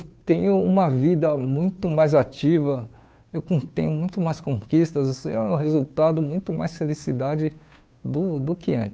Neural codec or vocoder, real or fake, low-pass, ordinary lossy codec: codec, 16 kHz, 2 kbps, FunCodec, trained on Chinese and English, 25 frames a second; fake; none; none